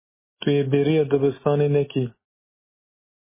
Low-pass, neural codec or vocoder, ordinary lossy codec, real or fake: 3.6 kHz; none; MP3, 16 kbps; real